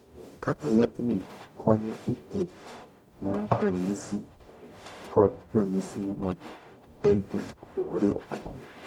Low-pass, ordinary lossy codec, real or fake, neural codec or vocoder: 19.8 kHz; MP3, 96 kbps; fake; codec, 44.1 kHz, 0.9 kbps, DAC